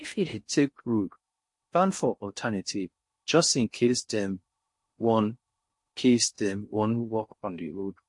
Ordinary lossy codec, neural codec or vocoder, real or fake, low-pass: MP3, 48 kbps; codec, 16 kHz in and 24 kHz out, 0.6 kbps, FocalCodec, streaming, 4096 codes; fake; 10.8 kHz